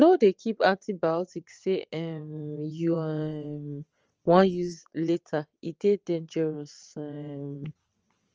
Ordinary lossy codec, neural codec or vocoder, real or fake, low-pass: Opus, 24 kbps; vocoder, 22.05 kHz, 80 mel bands, WaveNeXt; fake; 7.2 kHz